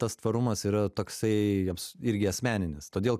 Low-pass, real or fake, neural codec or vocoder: 14.4 kHz; real; none